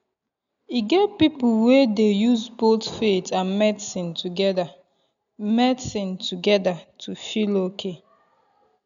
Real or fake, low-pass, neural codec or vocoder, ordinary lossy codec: real; 7.2 kHz; none; none